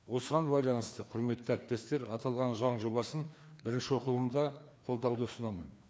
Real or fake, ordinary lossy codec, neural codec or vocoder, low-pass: fake; none; codec, 16 kHz, 2 kbps, FreqCodec, larger model; none